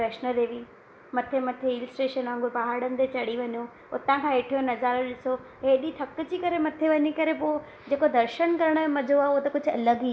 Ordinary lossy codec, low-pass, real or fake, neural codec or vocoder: none; none; real; none